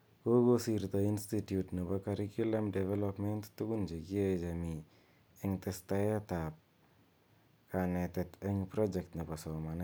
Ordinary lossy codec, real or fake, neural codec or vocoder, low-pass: none; real; none; none